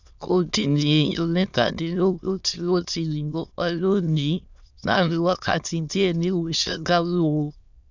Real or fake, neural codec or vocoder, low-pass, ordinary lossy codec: fake; autoencoder, 22.05 kHz, a latent of 192 numbers a frame, VITS, trained on many speakers; 7.2 kHz; none